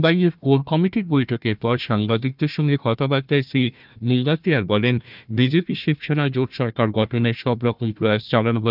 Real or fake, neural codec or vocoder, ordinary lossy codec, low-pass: fake; codec, 16 kHz, 1 kbps, FunCodec, trained on Chinese and English, 50 frames a second; none; 5.4 kHz